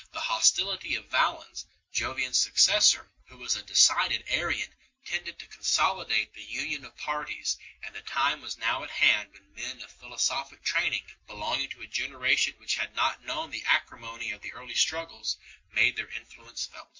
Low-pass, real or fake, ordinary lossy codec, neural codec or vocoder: 7.2 kHz; real; MP3, 48 kbps; none